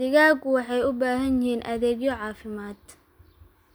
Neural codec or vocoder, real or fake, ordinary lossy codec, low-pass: none; real; none; none